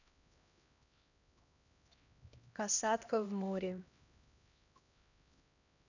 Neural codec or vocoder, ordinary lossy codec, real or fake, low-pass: codec, 16 kHz, 1 kbps, X-Codec, HuBERT features, trained on LibriSpeech; none; fake; 7.2 kHz